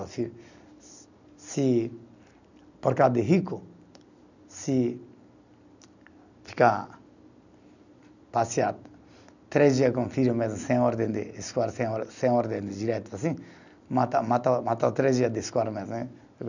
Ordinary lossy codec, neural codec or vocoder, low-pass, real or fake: none; none; 7.2 kHz; real